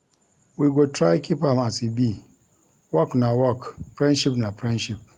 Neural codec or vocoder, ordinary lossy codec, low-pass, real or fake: none; Opus, 32 kbps; 19.8 kHz; real